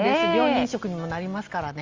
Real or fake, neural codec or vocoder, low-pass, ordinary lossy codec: real; none; 7.2 kHz; Opus, 32 kbps